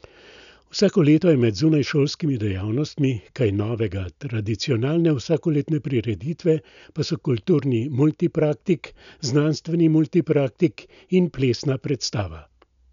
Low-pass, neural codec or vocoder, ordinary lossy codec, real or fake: 7.2 kHz; none; none; real